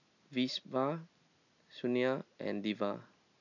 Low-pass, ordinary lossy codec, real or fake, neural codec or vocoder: 7.2 kHz; none; real; none